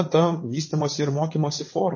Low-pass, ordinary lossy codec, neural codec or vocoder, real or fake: 7.2 kHz; MP3, 32 kbps; vocoder, 22.05 kHz, 80 mel bands, WaveNeXt; fake